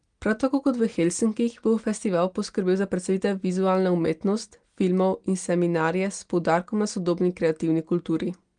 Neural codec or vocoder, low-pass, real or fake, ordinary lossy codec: none; 9.9 kHz; real; Opus, 24 kbps